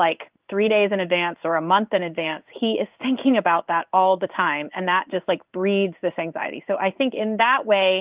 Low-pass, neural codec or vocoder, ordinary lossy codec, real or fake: 3.6 kHz; codec, 16 kHz in and 24 kHz out, 1 kbps, XY-Tokenizer; Opus, 32 kbps; fake